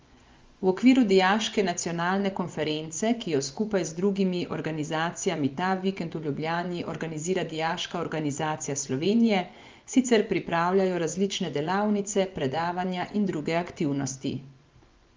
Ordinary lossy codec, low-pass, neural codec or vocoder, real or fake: Opus, 32 kbps; 7.2 kHz; vocoder, 24 kHz, 100 mel bands, Vocos; fake